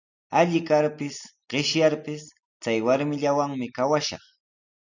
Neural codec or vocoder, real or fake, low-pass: none; real; 7.2 kHz